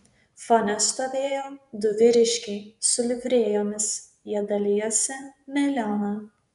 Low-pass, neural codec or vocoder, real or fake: 10.8 kHz; vocoder, 24 kHz, 100 mel bands, Vocos; fake